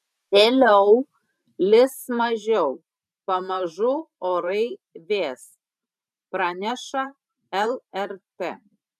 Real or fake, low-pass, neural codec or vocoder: fake; 14.4 kHz; vocoder, 44.1 kHz, 128 mel bands every 512 samples, BigVGAN v2